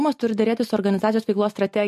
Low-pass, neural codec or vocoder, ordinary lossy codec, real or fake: 14.4 kHz; none; MP3, 64 kbps; real